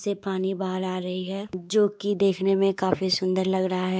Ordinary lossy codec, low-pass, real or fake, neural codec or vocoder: none; none; fake; codec, 16 kHz, 4 kbps, X-Codec, WavLM features, trained on Multilingual LibriSpeech